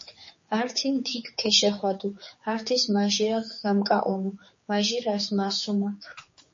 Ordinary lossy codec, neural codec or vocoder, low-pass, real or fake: MP3, 32 kbps; codec, 16 kHz, 4 kbps, X-Codec, HuBERT features, trained on general audio; 7.2 kHz; fake